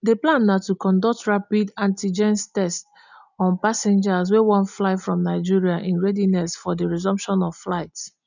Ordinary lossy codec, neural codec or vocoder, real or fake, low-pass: none; none; real; 7.2 kHz